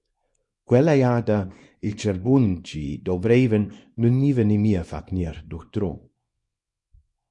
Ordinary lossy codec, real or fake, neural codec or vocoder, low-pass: MP3, 48 kbps; fake; codec, 24 kHz, 0.9 kbps, WavTokenizer, small release; 10.8 kHz